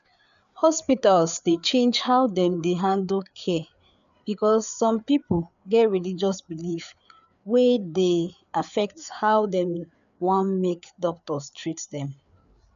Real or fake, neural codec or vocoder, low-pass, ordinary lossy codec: fake; codec, 16 kHz, 4 kbps, FreqCodec, larger model; 7.2 kHz; none